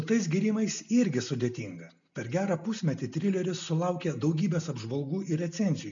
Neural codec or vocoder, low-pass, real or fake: none; 7.2 kHz; real